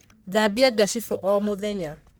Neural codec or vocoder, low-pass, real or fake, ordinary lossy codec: codec, 44.1 kHz, 1.7 kbps, Pupu-Codec; none; fake; none